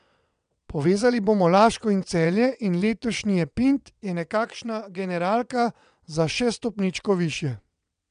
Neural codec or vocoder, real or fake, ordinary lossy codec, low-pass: vocoder, 22.05 kHz, 80 mel bands, WaveNeXt; fake; none; 9.9 kHz